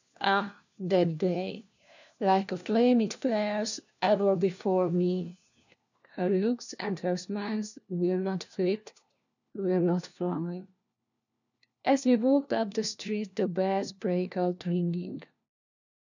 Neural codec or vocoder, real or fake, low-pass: codec, 16 kHz, 1 kbps, FunCodec, trained on LibriTTS, 50 frames a second; fake; 7.2 kHz